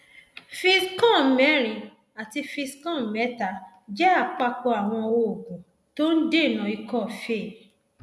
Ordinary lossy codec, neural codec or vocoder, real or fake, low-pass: none; none; real; none